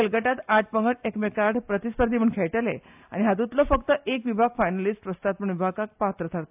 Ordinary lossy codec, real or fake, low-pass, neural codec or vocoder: AAC, 32 kbps; real; 3.6 kHz; none